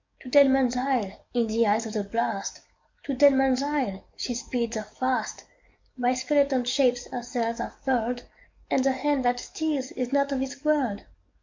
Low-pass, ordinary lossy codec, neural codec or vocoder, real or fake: 7.2 kHz; MP3, 64 kbps; codec, 44.1 kHz, 7.8 kbps, DAC; fake